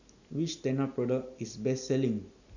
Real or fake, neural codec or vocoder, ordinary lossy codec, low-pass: real; none; none; 7.2 kHz